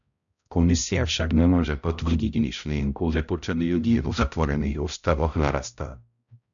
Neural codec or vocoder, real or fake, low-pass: codec, 16 kHz, 0.5 kbps, X-Codec, HuBERT features, trained on balanced general audio; fake; 7.2 kHz